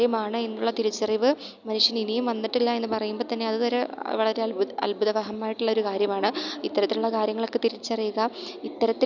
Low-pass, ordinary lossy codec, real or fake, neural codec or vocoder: 7.2 kHz; none; real; none